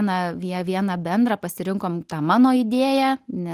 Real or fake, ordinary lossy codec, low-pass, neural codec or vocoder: real; Opus, 24 kbps; 14.4 kHz; none